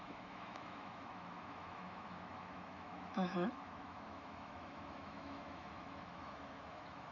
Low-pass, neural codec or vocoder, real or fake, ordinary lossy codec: 7.2 kHz; autoencoder, 48 kHz, 128 numbers a frame, DAC-VAE, trained on Japanese speech; fake; none